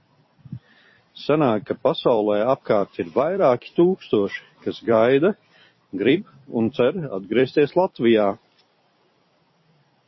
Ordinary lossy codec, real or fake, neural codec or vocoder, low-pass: MP3, 24 kbps; fake; vocoder, 44.1 kHz, 128 mel bands every 256 samples, BigVGAN v2; 7.2 kHz